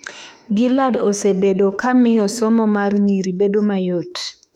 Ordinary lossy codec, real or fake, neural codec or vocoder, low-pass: none; fake; autoencoder, 48 kHz, 32 numbers a frame, DAC-VAE, trained on Japanese speech; 19.8 kHz